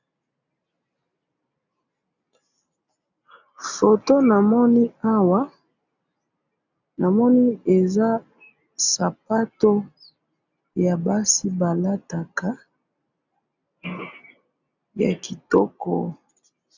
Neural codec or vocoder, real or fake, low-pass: none; real; 7.2 kHz